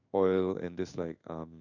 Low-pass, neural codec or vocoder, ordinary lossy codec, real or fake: 7.2 kHz; codec, 16 kHz in and 24 kHz out, 1 kbps, XY-Tokenizer; none; fake